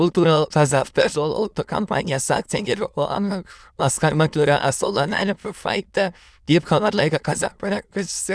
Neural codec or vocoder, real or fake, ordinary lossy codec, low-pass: autoencoder, 22.05 kHz, a latent of 192 numbers a frame, VITS, trained on many speakers; fake; none; none